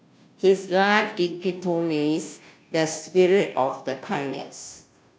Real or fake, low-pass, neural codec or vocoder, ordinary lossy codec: fake; none; codec, 16 kHz, 0.5 kbps, FunCodec, trained on Chinese and English, 25 frames a second; none